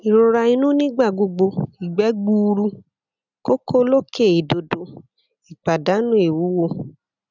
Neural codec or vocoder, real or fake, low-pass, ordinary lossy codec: none; real; 7.2 kHz; none